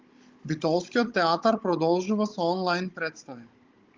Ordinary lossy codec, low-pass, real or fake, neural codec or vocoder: Opus, 32 kbps; 7.2 kHz; fake; codec, 16 kHz, 16 kbps, FunCodec, trained on Chinese and English, 50 frames a second